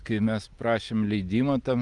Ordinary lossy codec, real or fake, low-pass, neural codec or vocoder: Opus, 32 kbps; real; 10.8 kHz; none